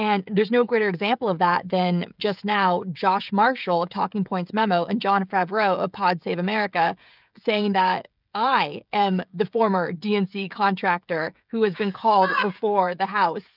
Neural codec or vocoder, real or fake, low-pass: codec, 16 kHz, 16 kbps, FreqCodec, smaller model; fake; 5.4 kHz